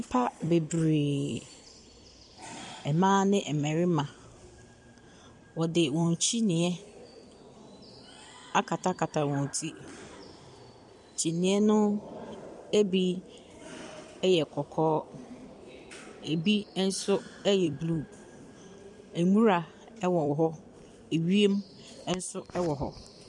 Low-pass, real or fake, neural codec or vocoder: 10.8 kHz; fake; vocoder, 44.1 kHz, 128 mel bands every 256 samples, BigVGAN v2